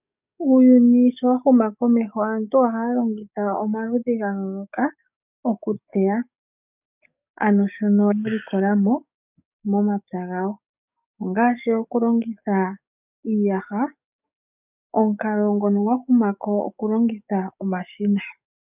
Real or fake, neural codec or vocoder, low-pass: fake; codec, 44.1 kHz, 7.8 kbps, DAC; 3.6 kHz